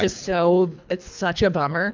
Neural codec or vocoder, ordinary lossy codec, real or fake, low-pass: codec, 24 kHz, 3 kbps, HILCodec; MP3, 64 kbps; fake; 7.2 kHz